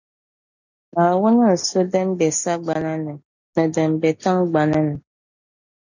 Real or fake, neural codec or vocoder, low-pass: real; none; 7.2 kHz